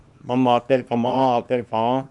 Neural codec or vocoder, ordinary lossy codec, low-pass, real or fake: codec, 24 kHz, 0.9 kbps, WavTokenizer, small release; MP3, 96 kbps; 10.8 kHz; fake